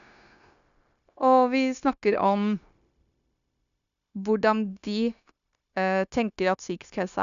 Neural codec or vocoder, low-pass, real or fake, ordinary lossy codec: codec, 16 kHz, 0.9 kbps, LongCat-Audio-Codec; 7.2 kHz; fake; none